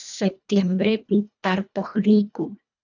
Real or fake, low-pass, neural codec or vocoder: fake; 7.2 kHz; codec, 24 kHz, 1.5 kbps, HILCodec